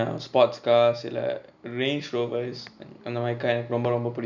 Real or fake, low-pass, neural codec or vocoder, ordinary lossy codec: real; 7.2 kHz; none; none